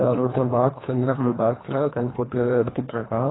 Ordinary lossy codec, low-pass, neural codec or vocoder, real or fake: AAC, 16 kbps; 7.2 kHz; codec, 24 kHz, 1.5 kbps, HILCodec; fake